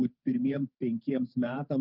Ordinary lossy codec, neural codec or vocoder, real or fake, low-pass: Opus, 24 kbps; vocoder, 44.1 kHz, 128 mel bands every 512 samples, BigVGAN v2; fake; 5.4 kHz